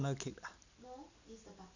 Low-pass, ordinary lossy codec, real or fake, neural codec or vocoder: 7.2 kHz; none; real; none